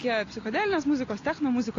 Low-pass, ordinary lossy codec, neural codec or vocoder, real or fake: 7.2 kHz; AAC, 32 kbps; none; real